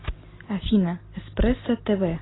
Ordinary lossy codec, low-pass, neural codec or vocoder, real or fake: AAC, 16 kbps; 7.2 kHz; none; real